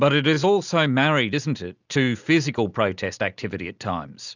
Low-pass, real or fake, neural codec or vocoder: 7.2 kHz; real; none